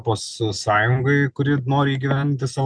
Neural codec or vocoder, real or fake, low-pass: none; real; 9.9 kHz